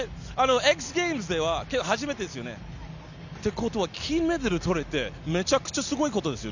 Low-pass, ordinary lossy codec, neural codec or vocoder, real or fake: 7.2 kHz; none; none; real